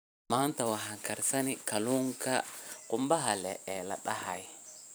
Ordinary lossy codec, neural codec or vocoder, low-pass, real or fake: none; vocoder, 44.1 kHz, 128 mel bands every 256 samples, BigVGAN v2; none; fake